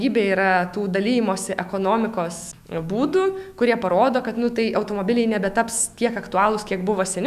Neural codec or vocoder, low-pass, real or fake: autoencoder, 48 kHz, 128 numbers a frame, DAC-VAE, trained on Japanese speech; 14.4 kHz; fake